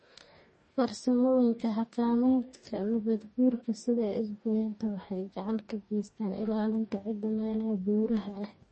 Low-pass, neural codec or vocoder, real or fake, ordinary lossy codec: 10.8 kHz; codec, 44.1 kHz, 2.6 kbps, DAC; fake; MP3, 32 kbps